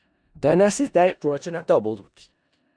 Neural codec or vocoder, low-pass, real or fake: codec, 16 kHz in and 24 kHz out, 0.4 kbps, LongCat-Audio-Codec, four codebook decoder; 9.9 kHz; fake